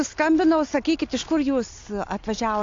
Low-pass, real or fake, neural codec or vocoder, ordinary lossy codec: 7.2 kHz; real; none; AAC, 48 kbps